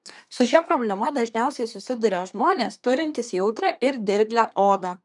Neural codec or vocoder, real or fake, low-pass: codec, 24 kHz, 1 kbps, SNAC; fake; 10.8 kHz